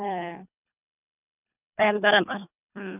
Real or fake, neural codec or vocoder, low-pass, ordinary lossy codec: fake; codec, 24 kHz, 1.5 kbps, HILCodec; 3.6 kHz; none